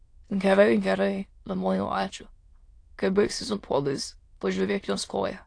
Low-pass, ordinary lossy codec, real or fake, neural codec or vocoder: 9.9 kHz; AAC, 48 kbps; fake; autoencoder, 22.05 kHz, a latent of 192 numbers a frame, VITS, trained on many speakers